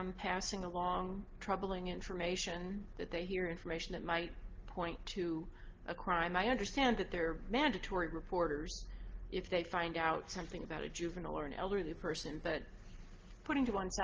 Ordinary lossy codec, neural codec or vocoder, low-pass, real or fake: Opus, 16 kbps; none; 7.2 kHz; real